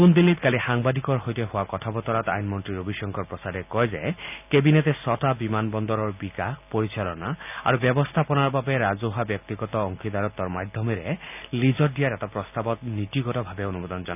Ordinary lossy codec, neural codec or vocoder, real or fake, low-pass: none; none; real; 3.6 kHz